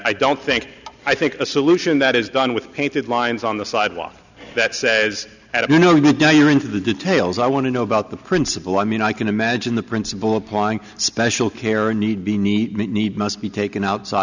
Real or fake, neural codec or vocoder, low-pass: real; none; 7.2 kHz